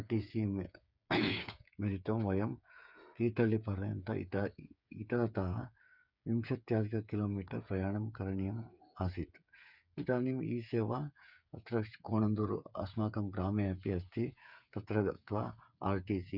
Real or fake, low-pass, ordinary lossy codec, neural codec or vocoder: fake; 5.4 kHz; none; codec, 16 kHz, 8 kbps, FreqCodec, smaller model